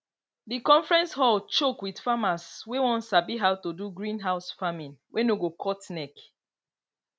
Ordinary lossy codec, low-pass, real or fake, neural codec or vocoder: none; none; real; none